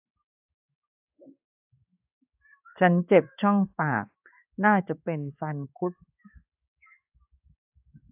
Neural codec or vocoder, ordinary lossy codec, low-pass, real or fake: codec, 16 kHz in and 24 kHz out, 1 kbps, XY-Tokenizer; AAC, 32 kbps; 3.6 kHz; fake